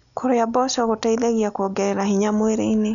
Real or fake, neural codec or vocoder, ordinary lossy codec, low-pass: real; none; none; 7.2 kHz